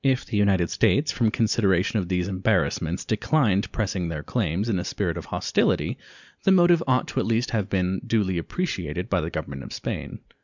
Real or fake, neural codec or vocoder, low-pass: real; none; 7.2 kHz